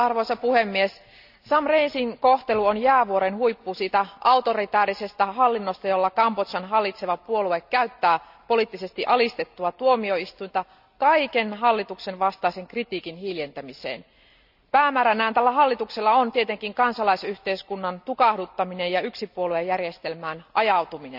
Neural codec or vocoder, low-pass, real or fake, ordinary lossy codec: none; 5.4 kHz; real; none